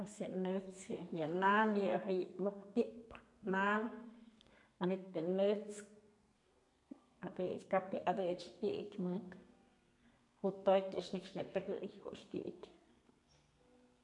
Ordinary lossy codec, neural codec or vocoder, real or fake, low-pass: none; codec, 32 kHz, 1.9 kbps, SNAC; fake; 10.8 kHz